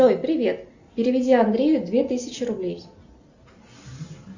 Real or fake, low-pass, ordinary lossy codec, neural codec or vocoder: real; 7.2 kHz; Opus, 64 kbps; none